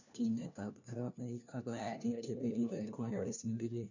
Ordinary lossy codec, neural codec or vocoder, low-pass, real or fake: none; codec, 16 kHz, 1 kbps, FunCodec, trained on LibriTTS, 50 frames a second; 7.2 kHz; fake